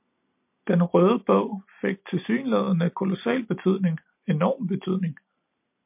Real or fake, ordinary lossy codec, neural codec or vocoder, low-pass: real; MP3, 32 kbps; none; 3.6 kHz